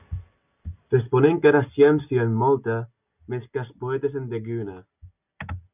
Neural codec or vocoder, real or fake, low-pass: none; real; 3.6 kHz